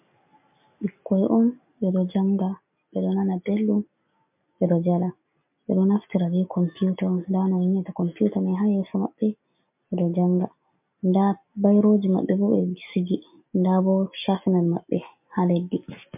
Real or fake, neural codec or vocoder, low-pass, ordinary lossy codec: real; none; 3.6 kHz; MP3, 32 kbps